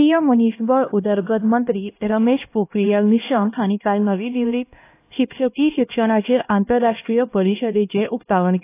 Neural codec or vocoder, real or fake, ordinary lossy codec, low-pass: codec, 16 kHz, 1 kbps, X-Codec, HuBERT features, trained on LibriSpeech; fake; AAC, 24 kbps; 3.6 kHz